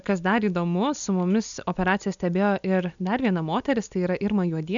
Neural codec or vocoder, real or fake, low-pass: none; real; 7.2 kHz